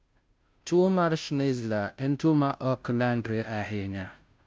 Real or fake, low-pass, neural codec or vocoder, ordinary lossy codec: fake; none; codec, 16 kHz, 0.5 kbps, FunCodec, trained on Chinese and English, 25 frames a second; none